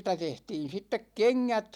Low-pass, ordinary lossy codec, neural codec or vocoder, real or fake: 19.8 kHz; none; none; real